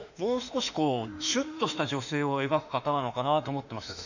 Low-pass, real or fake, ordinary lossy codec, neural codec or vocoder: 7.2 kHz; fake; none; autoencoder, 48 kHz, 32 numbers a frame, DAC-VAE, trained on Japanese speech